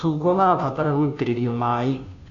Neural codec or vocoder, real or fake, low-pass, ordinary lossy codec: codec, 16 kHz, 0.5 kbps, FunCodec, trained on Chinese and English, 25 frames a second; fake; 7.2 kHz; none